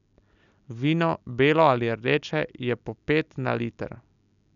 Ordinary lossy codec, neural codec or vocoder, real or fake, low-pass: none; none; real; 7.2 kHz